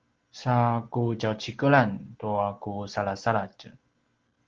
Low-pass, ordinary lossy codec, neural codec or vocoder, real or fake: 7.2 kHz; Opus, 16 kbps; none; real